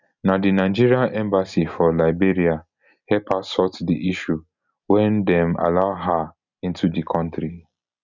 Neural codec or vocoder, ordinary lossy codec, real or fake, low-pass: none; none; real; 7.2 kHz